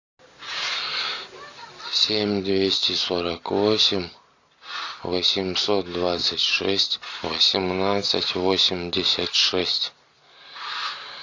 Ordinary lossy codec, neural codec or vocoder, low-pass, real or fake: AAC, 48 kbps; none; 7.2 kHz; real